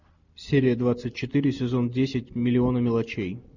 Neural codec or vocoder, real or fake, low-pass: none; real; 7.2 kHz